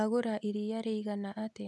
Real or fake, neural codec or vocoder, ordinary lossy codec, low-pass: real; none; none; none